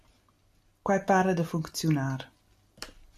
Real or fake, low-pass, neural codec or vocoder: real; 14.4 kHz; none